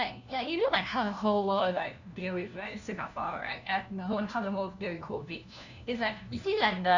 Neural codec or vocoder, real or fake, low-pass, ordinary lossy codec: codec, 16 kHz, 1 kbps, FunCodec, trained on LibriTTS, 50 frames a second; fake; 7.2 kHz; none